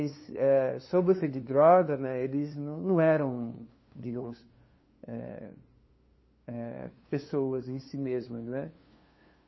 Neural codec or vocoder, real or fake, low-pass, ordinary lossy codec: codec, 16 kHz, 2 kbps, FunCodec, trained on LibriTTS, 25 frames a second; fake; 7.2 kHz; MP3, 24 kbps